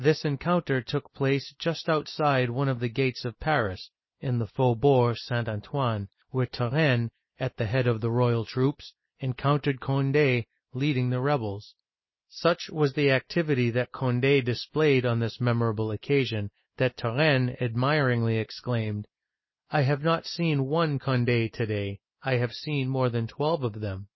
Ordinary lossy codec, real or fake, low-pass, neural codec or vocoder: MP3, 24 kbps; real; 7.2 kHz; none